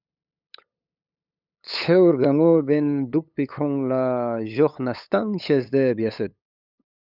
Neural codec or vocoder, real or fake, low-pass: codec, 16 kHz, 8 kbps, FunCodec, trained on LibriTTS, 25 frames a second; fake; 5.4 kHz